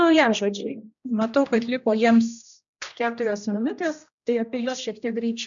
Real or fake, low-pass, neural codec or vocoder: fake; 7.2 kHz; codec, 16 kHz, 1 kbps, X-Codec, HuBERT features, trained on general audio